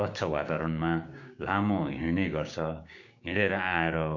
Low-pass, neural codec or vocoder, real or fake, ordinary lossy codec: 7.2 kHz; codec, 16 kHz, 6 kbps, DAC; fake; MP3, 64 kbps